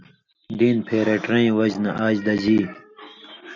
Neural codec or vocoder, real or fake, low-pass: none; real; 7.2 kHz